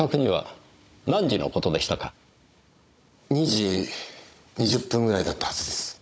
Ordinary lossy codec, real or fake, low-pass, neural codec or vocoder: none; fake; none; codec, 16 kHz, 16 kbps, FunCodec, trained on Chinese and English, 50 frames a second